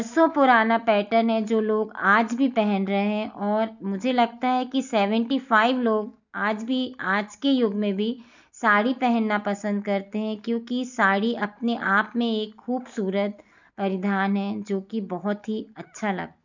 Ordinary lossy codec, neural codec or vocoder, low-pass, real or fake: none; none; 7.2 kHz; real